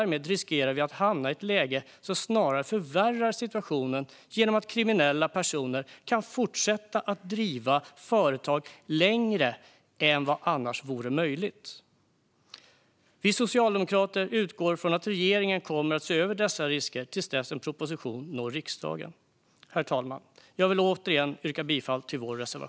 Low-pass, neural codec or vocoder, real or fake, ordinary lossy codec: none; none; real; none